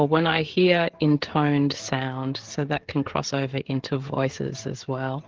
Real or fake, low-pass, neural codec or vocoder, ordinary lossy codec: fake; 7.2 kHz; codec, 16 kHz, 16 kbps, FreqCodec, smaller model; Opus, 16 kbps